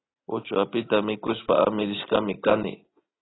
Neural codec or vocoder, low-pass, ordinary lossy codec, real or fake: none; 7.2 kHz; AAC, 16 kbps; real